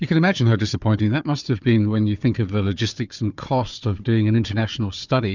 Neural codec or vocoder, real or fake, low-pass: codec, 16 kHz, 4 kbps, FunCodec, trained on Chinese and English, 50 frames a second; fake; 7.2 kHz